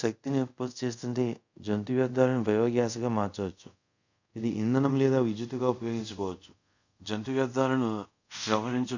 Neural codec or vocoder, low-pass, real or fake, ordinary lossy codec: codec, 24 kHz, 0.5 kbps, DualCodec; 7.2 kHz; fake; none